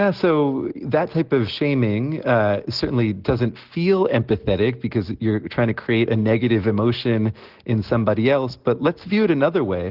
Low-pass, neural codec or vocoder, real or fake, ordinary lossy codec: 5.4 kHz; none; real; Opus, 16 kbps